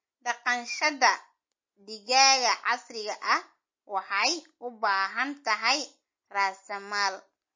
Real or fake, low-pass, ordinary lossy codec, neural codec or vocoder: real; 7.2 kHz; MP3, 32 kbps; none